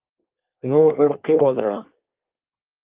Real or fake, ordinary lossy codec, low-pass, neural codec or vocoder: fake; Opus, 24 kbps; 3.6 kHz; codec, 24 kHz, 1 kbps, SNAC